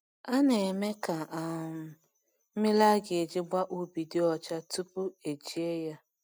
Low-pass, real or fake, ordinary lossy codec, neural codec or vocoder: none; real; none; none